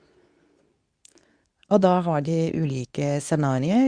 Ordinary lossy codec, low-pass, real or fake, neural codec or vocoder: Opus, 64 kbps; 9.9 kHz; fake; codec, 24 kHz, 0.9 kbps, WavTokenizer, medium speech release version 2